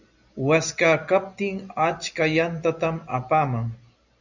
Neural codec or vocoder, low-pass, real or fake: none; 7.2 kHz; real